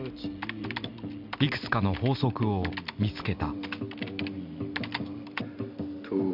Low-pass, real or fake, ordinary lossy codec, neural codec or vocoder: 5.4 kHz; real; none; none